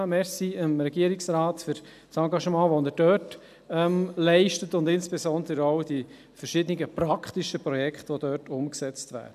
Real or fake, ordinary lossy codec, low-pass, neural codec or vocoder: real; none; 14.4 kHz; none